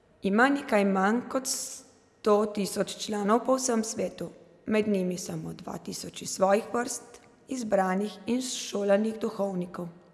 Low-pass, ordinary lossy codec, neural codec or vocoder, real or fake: none; none; none; real